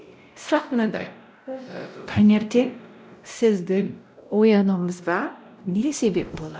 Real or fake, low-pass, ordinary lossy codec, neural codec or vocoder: fake; none; none; codec, 16 kHz, 0.5 kbps, X-Codec, WavLM features, trained on Multilingual LibriSpeech